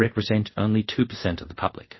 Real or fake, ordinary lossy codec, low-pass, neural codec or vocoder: fake; MP3, 24 kbps; 7.2 kHz; codec, 24 kHz, 0.5 kbps, DualCodec